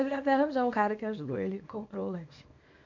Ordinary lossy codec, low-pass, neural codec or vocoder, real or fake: MP3, 48 kbps; 7.2 kHz; codec, 24 kHz, 0.9 kbps, WavTokenizer, small release; fake